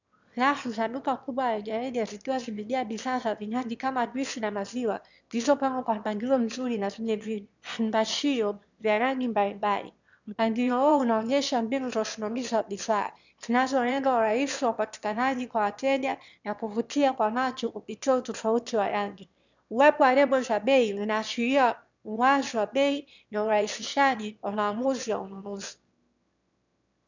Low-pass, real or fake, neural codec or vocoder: 7.2 kHz; fake; autoencoder, 22.05 kHz, a latent of 192 numbers a frame, VITS, trained on one speaker